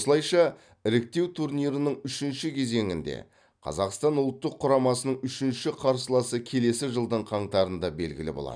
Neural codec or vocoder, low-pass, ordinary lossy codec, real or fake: none; 9.9 kHz; none; real